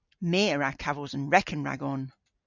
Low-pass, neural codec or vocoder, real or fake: 7.2 kHz; none; real